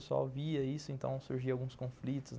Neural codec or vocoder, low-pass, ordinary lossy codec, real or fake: none; none; none; real